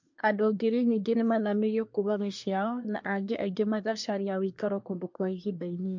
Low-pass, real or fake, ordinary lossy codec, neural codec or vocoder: 7.2 kHz; fake; MP3, 48 kbps; codec, 24 kHz, 1 kbps, SNAC